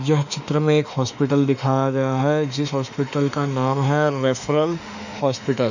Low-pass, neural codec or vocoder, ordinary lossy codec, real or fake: 7.2 kHz; autoencoder, 48 kHz, 32 numbers a frame, DAC-VAE, trained on Japanese speech; none; fake